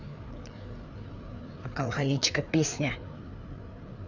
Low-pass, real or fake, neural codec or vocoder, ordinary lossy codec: 7.2 kHz; fake; codec, 16 kHz, 4 kbps, FreqCodec, larger model; none